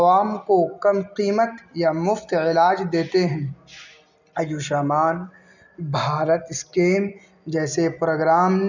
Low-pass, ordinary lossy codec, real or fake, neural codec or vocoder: 7.2 kHz; none; real; none